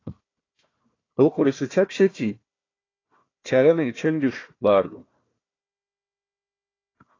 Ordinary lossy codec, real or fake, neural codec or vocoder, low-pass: AAC, 32 kbps; fake; codec, 16 kHz, 1 kbps, FunCodec, trained on Chinese and English, 50 frames a second; 7.2 kHz